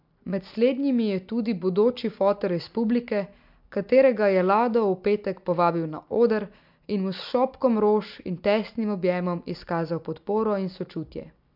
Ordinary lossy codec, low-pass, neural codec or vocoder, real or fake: MP3, 48 kbps; 5.4 kHz; none; real